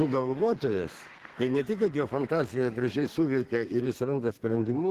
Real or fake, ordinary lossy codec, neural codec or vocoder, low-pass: fake; Opus, 16 kbps; codec, 32 kHz, 1.9 kbps, SNAC; 14.4 kHz